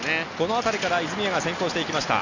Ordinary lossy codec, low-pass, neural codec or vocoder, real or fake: none; 7.2 kHz; none; real